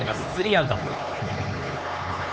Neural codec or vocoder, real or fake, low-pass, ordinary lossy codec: codec, 16 kHz, 4 kbps, X-Codec, HuBERT features, trained on LibriSpeech; fake; none; none